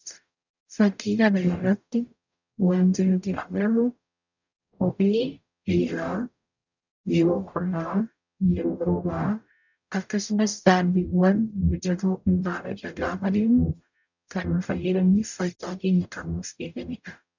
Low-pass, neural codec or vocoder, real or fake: 7.2 kHz; codec, 44.1 kHz, 0.9 kbps, DAC; fake